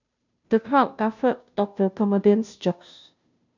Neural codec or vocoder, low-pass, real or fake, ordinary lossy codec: codec, 16 kHz, 0.5 kbps, FunCodec, trained on Chinese and English, 25 frames a second; 7.2 kHz; fake; none